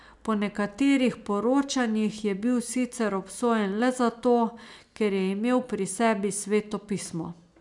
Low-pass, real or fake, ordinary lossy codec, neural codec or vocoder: 10.8 kHz; real; none; none